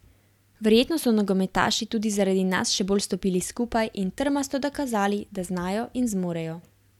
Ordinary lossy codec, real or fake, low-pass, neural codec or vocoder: none; real; 19.8 kHz; none